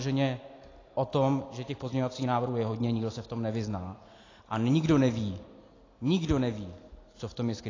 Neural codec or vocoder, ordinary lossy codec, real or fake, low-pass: none; AAC, 32 kbps; real; 7.2 kHz